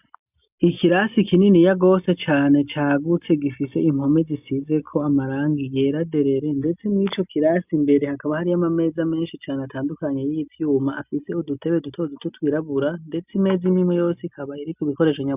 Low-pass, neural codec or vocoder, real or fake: 3.6 kHz; none; real